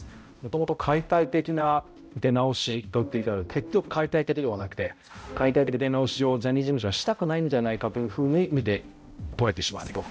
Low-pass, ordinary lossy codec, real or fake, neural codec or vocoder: none; none; fake; codec, 16 kHz, 0.5 kbps, X-Codec, HuBERT features, trained on balanced general audio